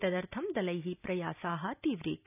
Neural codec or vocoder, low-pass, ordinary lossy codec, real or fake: none; 3.6 kHz; none; real